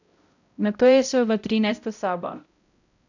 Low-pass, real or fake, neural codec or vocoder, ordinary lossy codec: 7.2 kHz; fake; codec, 16 kHz, 0.5 kbps, X-Codec, HuBERT features, trained on balanced general audio; none